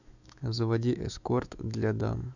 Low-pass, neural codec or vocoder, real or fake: 7.2 kHz; autoencoder, 48 kHz, 128 numbers a frame, DAC-VAE, trained on Japanese speech; fake